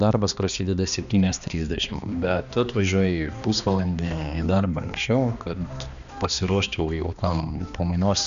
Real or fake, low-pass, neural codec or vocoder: fake; 7.2 kHz; codec, 16 kHz, 2 kbps, X-Codec, HuBERT features, trained on balanced general audio